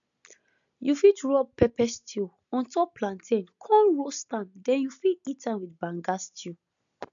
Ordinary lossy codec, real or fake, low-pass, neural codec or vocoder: none; real; 7.2 kHz; none